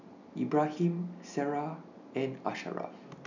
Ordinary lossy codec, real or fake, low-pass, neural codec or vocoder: none; real; 7.2 kHz; none